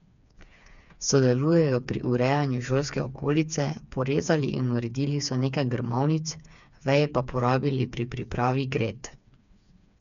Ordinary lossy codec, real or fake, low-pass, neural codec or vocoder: none; fake; 7.2 kHz; codec, 16 kHz, 4 kbps, FreqCodec, smaller model